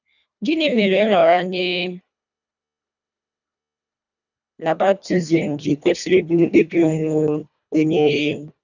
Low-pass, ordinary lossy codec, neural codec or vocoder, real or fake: 7.2 kHz; none; codec, 24 kHz, 1.5 kbps, HILCodec; fake